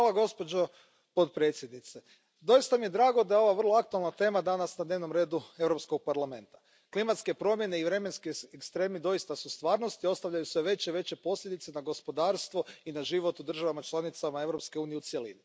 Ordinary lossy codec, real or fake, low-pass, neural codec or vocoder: none; real; none; none